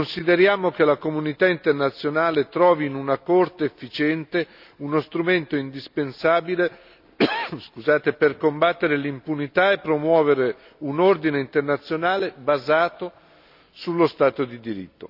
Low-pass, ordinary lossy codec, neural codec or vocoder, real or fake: 5.4 kHz; none; none; real